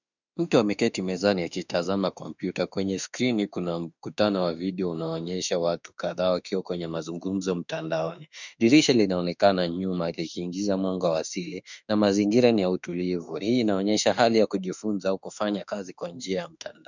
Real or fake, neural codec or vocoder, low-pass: fake; autoencoder, 48 kHz, 32 numbers a frame, DAC-VAE, trained on Japanese speech; 7.2 kHz